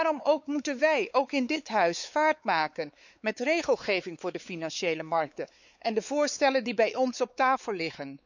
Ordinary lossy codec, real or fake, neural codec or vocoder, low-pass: none; fake; codec, 16 kHz, 4 kbps, X-Codec, WavLM features, trained on Multilingual LibriSpeech; 7.2 kHz